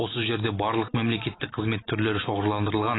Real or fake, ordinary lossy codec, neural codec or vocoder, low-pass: real; AAC, 16 kbps; none; 7.2 kHz